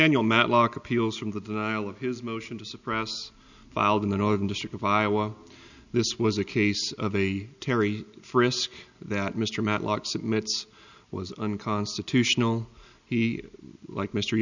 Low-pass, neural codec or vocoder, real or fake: 7.2 kHz; none; real